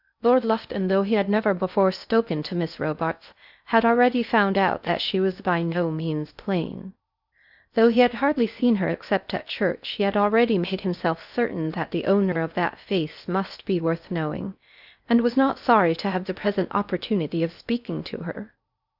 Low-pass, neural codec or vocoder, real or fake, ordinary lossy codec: 5.4 kHz; codec, 16 kHz in and 24 kHz out, 0.6 kbps, FocalCodec, streaming, 2048 codes; fake; Opus, 64 kbps